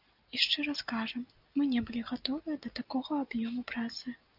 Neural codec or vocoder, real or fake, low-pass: none; real; 5.4 kHz